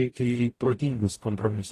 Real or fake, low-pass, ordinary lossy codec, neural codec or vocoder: fake; 14.4 kHz; AAC, 48 kbps; codec, 44.1 kHz, 0.9 kbps, DAC